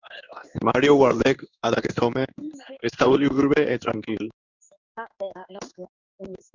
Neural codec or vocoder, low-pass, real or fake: codec, 16 kHz in and 24 kHz out, 1 kbps, XY-Tokenizer; 7.2 kHz; fake